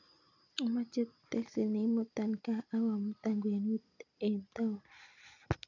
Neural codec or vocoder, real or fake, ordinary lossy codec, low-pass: none; real; none; 7.2 kHz